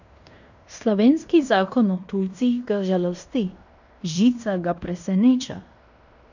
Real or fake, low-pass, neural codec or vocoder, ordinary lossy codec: fake; 7.2 kHz; codec, 16 kHz in and 24 kHz out, 0.9 kbps, LongCat-Audio-Codec, fine tuned four codebook decoder; none